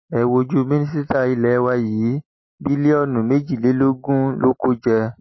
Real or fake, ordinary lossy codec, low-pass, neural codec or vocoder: real; MP3, 24 kbps; 7.2 kHz; none